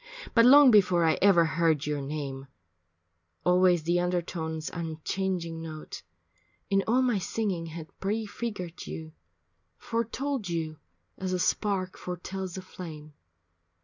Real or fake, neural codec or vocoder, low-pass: real; none; 7.2 kHz